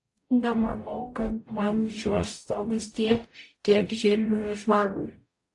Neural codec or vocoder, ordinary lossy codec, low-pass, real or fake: codec, 44.1 kHz, 0.9 kbps, DAC; AAC, 48 kbps; 10.8 kHz; fake